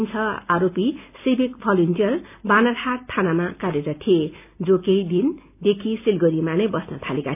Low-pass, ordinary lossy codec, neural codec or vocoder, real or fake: 3.6 kHz; none; none; real